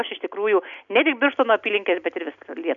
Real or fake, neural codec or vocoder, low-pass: real; none; 7.2 kHz